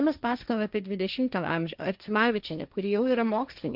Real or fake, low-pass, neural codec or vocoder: fake; 5.4 kHz; codec, 16 kHz, 1.1 kbps, Voila-Tokenizer